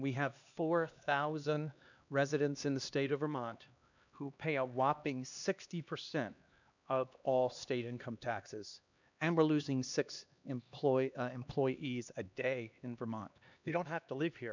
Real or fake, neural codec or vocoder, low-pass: fake; codec, 16 kHz, 2 kbps, X-Codec, HuBERT features, trained on LibriSpeech; 7.2 kHz